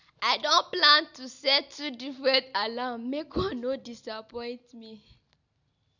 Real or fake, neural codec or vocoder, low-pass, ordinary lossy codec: real; none; 7.2 kHz; none